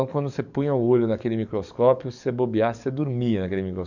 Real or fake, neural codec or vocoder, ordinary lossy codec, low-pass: fake; codec, 44.1 kHz, 7.8 kbps, DAC; none; 7.2 kHz